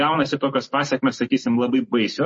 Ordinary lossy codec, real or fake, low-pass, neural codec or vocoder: MP3, 32 kbps; real; 9.9 kHz; none